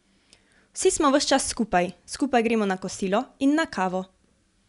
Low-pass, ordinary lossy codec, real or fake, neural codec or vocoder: 10.8 kHz; none; real; none